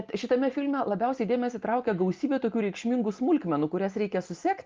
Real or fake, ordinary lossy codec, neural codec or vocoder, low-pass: real; Opus, 32 kbps; none; 7.2 kHz